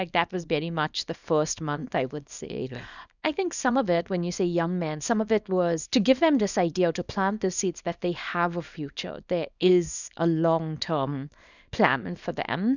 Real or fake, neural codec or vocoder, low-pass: fake; codec, 24 kHz, 0.9 kbps, WavTokenizer, small release; 7.2 kHz